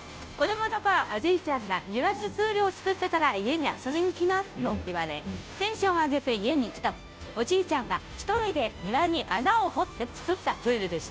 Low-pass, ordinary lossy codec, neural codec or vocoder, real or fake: none; none; codec, 16 kHz, 0.5 kbps, FunCodec, trained on Chinese and English, 25 frames a second; fake